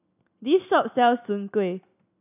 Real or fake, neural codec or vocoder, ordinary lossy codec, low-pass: real; none; none; 3.6 kHz